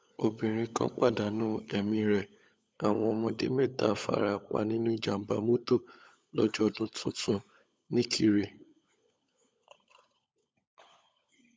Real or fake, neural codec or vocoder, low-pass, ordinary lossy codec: fake; codec, 16 kHz, 16 kbps, FunCodec, trained on LibriTTS, 50 frames a second; none; none